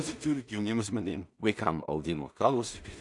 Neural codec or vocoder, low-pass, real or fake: codec, 16 kHz in and 24 kHz out, 0.4 kbps, LongCat-Audio-Codec, two codebook decoder; 10.8 kHz; fake